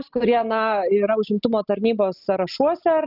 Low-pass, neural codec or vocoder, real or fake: 5.4 kHz; none; real